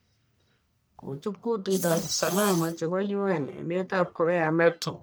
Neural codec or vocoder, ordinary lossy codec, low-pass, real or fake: codec, 44.1 kHz, 1.7 kbps, Pupu-Codec; none; none; fake